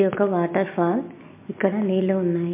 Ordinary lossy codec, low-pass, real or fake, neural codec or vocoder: MP3, 24 kbps; 3.6 kHz; real; none